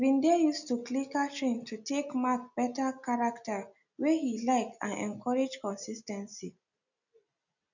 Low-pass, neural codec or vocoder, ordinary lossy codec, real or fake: 7.2 kHz; none; none; real